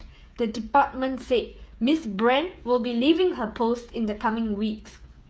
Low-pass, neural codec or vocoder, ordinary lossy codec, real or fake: none; codec, 16 kHz, 8 kbps, FreqCodec, smaller model; none; fake